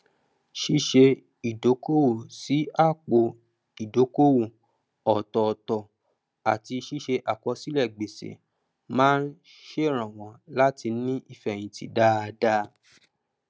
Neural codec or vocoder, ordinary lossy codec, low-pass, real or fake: none; none; none; real